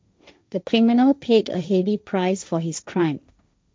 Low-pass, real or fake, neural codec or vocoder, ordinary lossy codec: none; fake; codec, 16 kHz, 1.1 kbps, Voila-Tokenizer; none